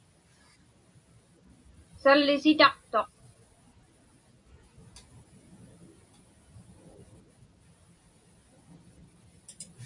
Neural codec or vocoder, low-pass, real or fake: none; 10.8 kHz; real